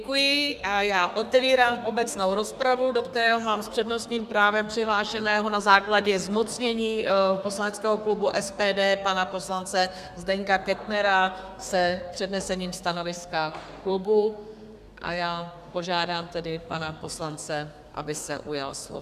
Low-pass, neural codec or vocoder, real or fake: 14.4 kHz; codec, 32 kHz, 1.9 kbps, SNAC; fake